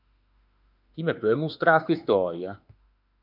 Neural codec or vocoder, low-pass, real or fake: autoencoder, 48 kHz, 32 numbers a frame, DAC-VAE, trained on Japanese speech; 5.4 kHz; fake